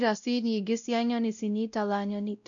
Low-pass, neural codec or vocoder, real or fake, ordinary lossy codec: 7.2 kHz; codec, 16 kHz, 0.5 kbps, X-Codec, WavLM features, trained on Multilingual LibriSpeech; fake; none